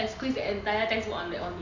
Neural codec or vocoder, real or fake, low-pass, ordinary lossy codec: none; real; 7.2 kHz; none